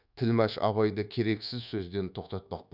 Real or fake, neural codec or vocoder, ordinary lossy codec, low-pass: fake; codec, 24 kHz, 1.2 kbps, DualCodec; none; 5.4 kHz